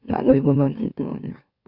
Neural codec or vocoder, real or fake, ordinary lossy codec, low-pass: autoencoder, 44.1 kHz, a latent of 192 numbers a frame, MeloTTS; fake; Opus, 64 kbps; 5.4 kHz